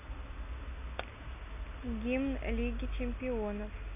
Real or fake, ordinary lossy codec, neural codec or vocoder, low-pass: real; none; none; 3.6 kHz